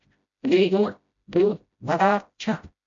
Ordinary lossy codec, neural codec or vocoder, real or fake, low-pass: MP3, 48 kbps; codec, 16 kHz, 0.5 kbps, FreqCodec, smaller model; fake; 7.2 kHz